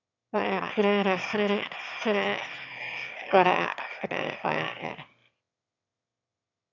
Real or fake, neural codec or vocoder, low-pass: fake; autoencoder, 22.05 kHz, a latent of 192 numbers a frame, VITS, trained on one speaker; 7.2 kHz